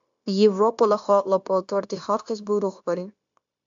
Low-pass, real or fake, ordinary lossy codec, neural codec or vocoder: 7.2 kHz; fake; AAC, 64 kbps; codec, 16 kHz, 0.9 kbps, LongCat-Audio-Codec